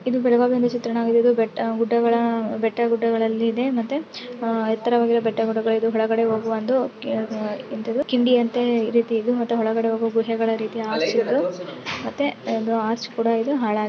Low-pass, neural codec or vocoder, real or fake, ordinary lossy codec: none; none; real; none